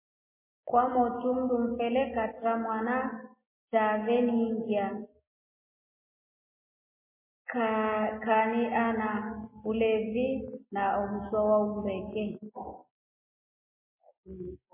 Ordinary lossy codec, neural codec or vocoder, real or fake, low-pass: MP3, 16 kbps; none; real; 3.6 kHz